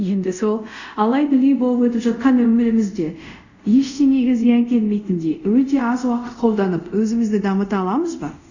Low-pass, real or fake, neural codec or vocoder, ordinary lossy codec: 7.2 kHz; fake; codec, 24 kHz, 0.5 kbps, DualCodec; none